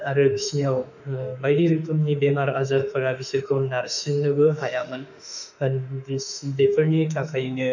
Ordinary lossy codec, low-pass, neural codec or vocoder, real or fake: none; 7.2 kHz; autoencoder, 48 kHz, 32 numbers a frame, DAC-VAE, trained on Japanese speech; fake